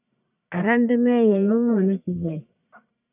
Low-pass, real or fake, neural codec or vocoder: 3.6 kHz; fake; codec, 44.1 kHz, 1.7 kbps, Pupu-Codec